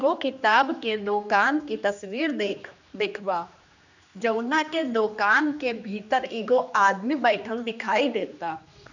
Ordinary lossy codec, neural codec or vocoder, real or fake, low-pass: none; codec, 16 kHz, 2 kbps, X-Codec, HuBERT features, trained on general audio; fake; 7.2 kHz